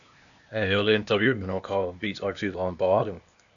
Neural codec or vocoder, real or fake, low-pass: codec, 16 kHz, 0.8 kbps, ZipCodec; fake; 7.2 kHz